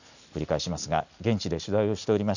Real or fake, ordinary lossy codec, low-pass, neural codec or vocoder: real; none; 7.2 kHz; none